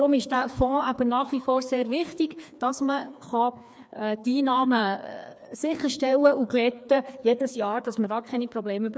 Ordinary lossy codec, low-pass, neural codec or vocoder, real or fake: none; none; codec, 16 kHz, 2 kbps, FreqCodec, larger model; fake